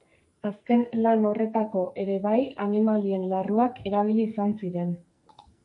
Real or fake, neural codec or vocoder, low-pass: fake; codec, 44.1 kHz, 2.6 kbps, SNAC; 10.8 kHz